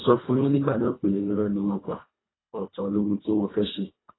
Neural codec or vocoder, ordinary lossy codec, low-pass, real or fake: codec, 24 kHz, 1.5 kbps, HILCodec; AAC, 16 kbps; 7.2 kHz; fake